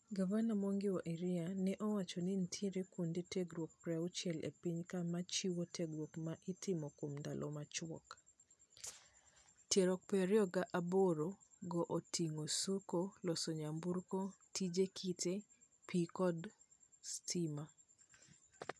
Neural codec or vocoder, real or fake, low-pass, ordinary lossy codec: none; real; none; none